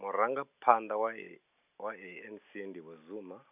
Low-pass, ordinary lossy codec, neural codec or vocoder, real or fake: 3.6 kHz; none; none; real